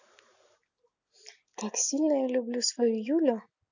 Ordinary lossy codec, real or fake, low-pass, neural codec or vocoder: none; real; 7.2 kHz; none